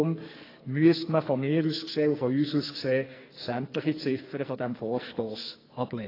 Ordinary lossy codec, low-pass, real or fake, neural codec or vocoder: AAC, 24 kbps; 5.4 kHz; fake; codec, 44.1 kHz, 2.6 kbps, SNAC